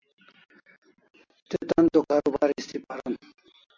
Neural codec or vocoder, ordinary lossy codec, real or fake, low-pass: none; MP3, 48 kbps; real; 7.2 kHz